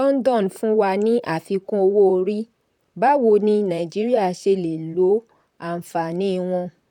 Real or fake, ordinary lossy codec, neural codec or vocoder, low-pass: fake; none; vocoder, 44.1 kHz, 128 mel bands, Pupu-Vocoder; 19.8 kHz